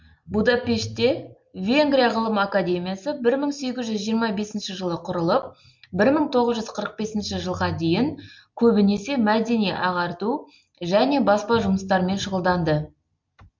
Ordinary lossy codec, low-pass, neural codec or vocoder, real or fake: MP3, 48 kbps; 7.2 kHz; none; real